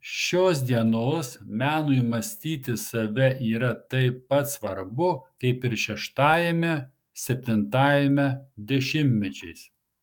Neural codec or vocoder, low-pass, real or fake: codec, 44.1 kHz, 7.8 kbps, DAC; 19.8 kHz; fake